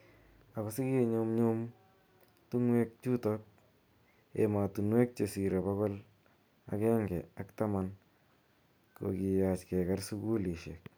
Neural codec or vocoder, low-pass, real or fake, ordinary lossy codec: none; none; real; none